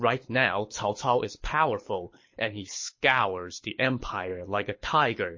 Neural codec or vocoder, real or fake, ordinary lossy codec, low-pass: codec, 24 kHz, 6 kbps, HILCodec; fake; MP3, 32 kbps; 7.2 kHz